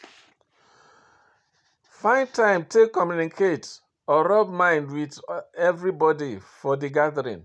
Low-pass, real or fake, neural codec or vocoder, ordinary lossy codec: none; real; none; none